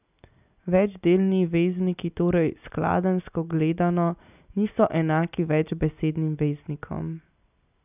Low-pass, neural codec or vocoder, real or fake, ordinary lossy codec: 3.6 kHz; none; real; none